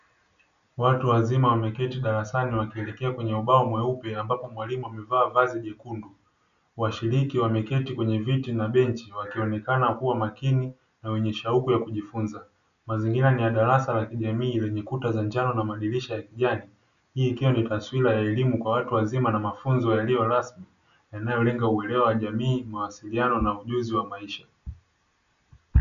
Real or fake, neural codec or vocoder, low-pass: real; none; 7.2 kHz